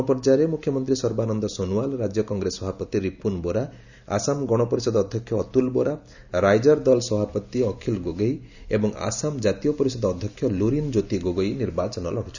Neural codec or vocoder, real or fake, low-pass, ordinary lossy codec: none; real; 7.2 kHz; none